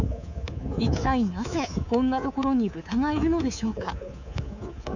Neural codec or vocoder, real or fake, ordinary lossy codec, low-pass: codec, 24 kHz, 3.1 kbps, DualCodec; fake; none; 7.2 kHz